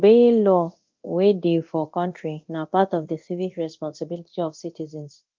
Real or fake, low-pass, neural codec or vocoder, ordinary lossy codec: fake; 7.2 kHz; codec, 24 kHz, 0.9 kbps, DualCodec; Opus, 32 kbps